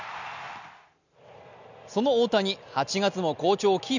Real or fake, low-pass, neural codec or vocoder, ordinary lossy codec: real; 7.2 kHz; none; none